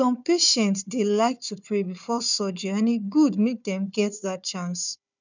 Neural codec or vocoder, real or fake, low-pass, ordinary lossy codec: codec, 16 kHz, 4 kbps, FunCodec, trained on Chinese and English, 50 frames a second; fake; 7.2 kHz; none